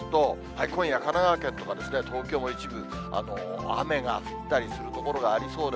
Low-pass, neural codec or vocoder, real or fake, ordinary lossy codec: none; none; real; none